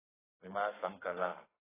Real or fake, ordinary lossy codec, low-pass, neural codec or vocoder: fake; AAC, 16 kbps; 3.6 kHz; codec, 32 kHz, 1.9 kbps, SNAC